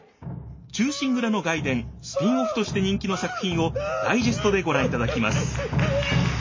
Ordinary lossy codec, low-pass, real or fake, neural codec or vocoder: MP3, 32 kbps; 7.2 kHz; real; none